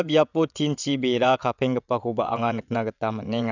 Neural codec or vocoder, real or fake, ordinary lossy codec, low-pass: vocoder, 22.05 kHz, 80 mel bands, WaveNeXt; fake; none; 7.2 kHz